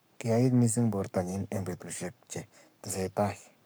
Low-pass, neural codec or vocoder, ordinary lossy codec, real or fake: none; codec, 44.1 kHz, 7.8 kbps, Pupu-Codec; none; fake